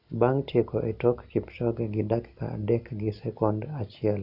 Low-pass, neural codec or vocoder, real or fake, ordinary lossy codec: 5.4 kHz; none; real; none